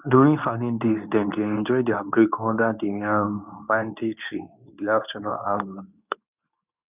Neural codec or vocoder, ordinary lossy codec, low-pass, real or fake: codec, 24 kHz, 0.9 kbps, WavTokenizer, medium speech release version 2; none; 3.6 kHz; fake